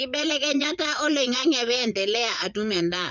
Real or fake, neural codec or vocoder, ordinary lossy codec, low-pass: fake; vocoder, 22.05 kHz, 80 mel bands, Vocos; none; 7.2 kHz